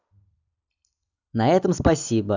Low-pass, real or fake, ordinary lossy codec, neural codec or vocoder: 7.2 kHz; real; none; none